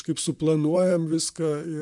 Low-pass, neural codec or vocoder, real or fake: 10.8 kHz; vocoder, 44.1 kHz, 128 mel bands, Pupu-Vocoder; fake